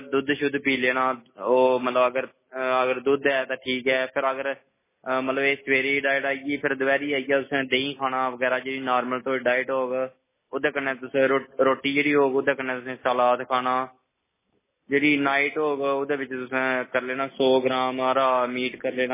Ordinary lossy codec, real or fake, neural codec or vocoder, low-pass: MP3, 16 kbps; real; none; 3.6 kHz